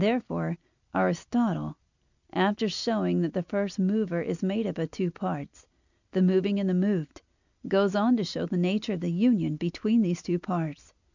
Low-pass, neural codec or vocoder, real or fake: 7.2 kHz; none; real